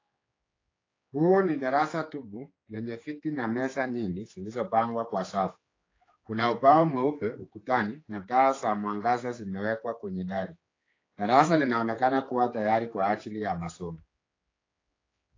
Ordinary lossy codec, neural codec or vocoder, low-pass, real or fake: AAC, 32 kbps; codec, 16 kHz, 4 kbps, X-Codec, HuBERT features, trained on general audio; 7.2 kHz; fake